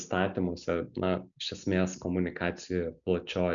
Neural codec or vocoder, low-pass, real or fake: none; 7.2 kHz; real